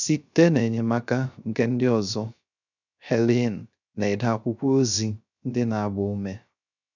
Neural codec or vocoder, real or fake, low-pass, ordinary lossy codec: codec, 16 kHz, 0.3 kbps, FocalCodec; fake; 7.2 kHz; none